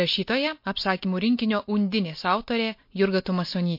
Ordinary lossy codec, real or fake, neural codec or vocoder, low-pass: MP3, 32 kbps; real; none; 5.4 kHz